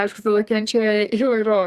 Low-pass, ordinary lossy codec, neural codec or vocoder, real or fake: 14.4 kHz; Opus, 64 kbps; codec, 32 kHz, 1.9 kbps, SNAC; fake